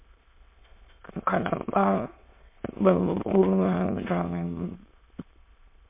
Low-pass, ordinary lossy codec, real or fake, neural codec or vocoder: 3.6 kHz; MP3, 24 kbps; fake; autoencoder, 22.05 kHz, a latent of 192 numbers a frame, VITS, trained on many speakers